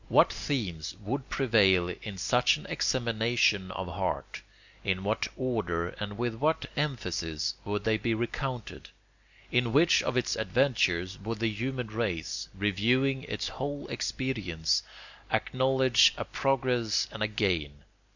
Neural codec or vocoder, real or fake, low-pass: none; real; 7.2 kHz